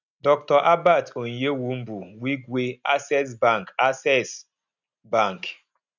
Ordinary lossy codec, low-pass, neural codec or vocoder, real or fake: none; 7.2 kHz; none; real